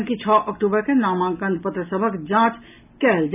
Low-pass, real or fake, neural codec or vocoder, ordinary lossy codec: 3.6 kHz; real; none; none